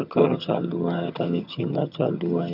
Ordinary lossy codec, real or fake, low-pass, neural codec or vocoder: none; fake; 5.4 kHz; vocoder, 22.05 kHz, 80 mel bands, HiFi-GAN